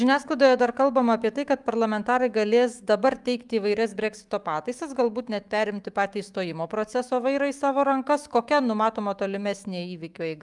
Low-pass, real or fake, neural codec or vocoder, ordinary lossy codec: 10.8 kHz; fake; autoencoder, 48 kHz, 128 numbers a frame, DAC-VAE, trained on Japanese speech; Opus, 32 kbps